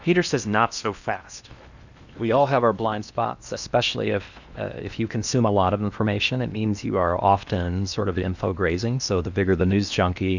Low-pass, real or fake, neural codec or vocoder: 7.2 kHz; fake; codec, 16 kHz in and 24 kHz out, 0.8 kbps, FocalCodec, streaming, 65536 codes